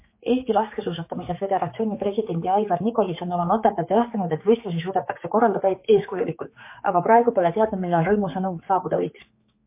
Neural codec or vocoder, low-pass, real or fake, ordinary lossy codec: codec, 16 kHz, 4 kbps, X-Codec, HuBERT features, trained on general audio; 3.6 kHz; fake; MP3, 24 kbps